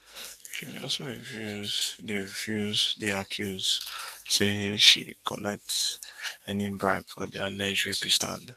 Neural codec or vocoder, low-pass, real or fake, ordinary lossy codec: codec, 44.1 kHz, 2.6 kbps, SNAC; 14.4 kHz; fake; none